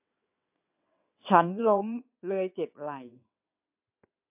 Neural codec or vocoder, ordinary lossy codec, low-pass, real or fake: vocoder, 22.05 kHz, 80 mel bands, WaveNeXt; MP3, 32 kbps; 3.6 kHz; fake